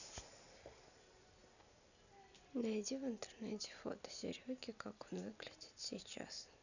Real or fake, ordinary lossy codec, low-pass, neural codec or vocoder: real; none; 7.2 kHz; none